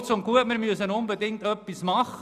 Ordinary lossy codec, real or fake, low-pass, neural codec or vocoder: none; real; 14.4 kHz; none